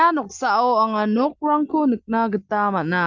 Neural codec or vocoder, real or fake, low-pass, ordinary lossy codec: none; real; 7.2 kHz; Opus, 24 kbps